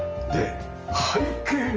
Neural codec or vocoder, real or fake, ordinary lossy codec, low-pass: none; real; Opus, 24 kbps; 7.2 kHz